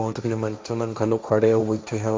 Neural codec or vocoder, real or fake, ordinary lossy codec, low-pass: codec, 16 kHz, 1.1 kbps, Voila-Tokenizer; fake; none; none